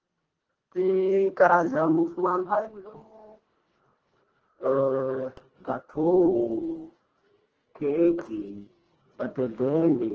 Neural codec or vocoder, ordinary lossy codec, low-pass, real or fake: codec, 24 kHz, 1.5 kbps, HILCodec; Opus, 16 kbps; 7.2 kHz; fake